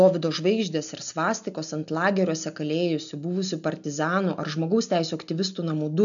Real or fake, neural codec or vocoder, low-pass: real; none; 7.2 kHz